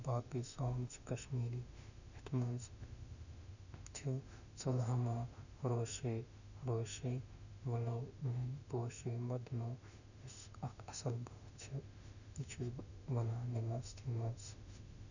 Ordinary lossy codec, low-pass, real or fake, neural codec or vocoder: none; 7.2 kHz; fake; autoencoder, 48 kHz, 32 numbers a frame, DAC-VAE, trained on Japanese speech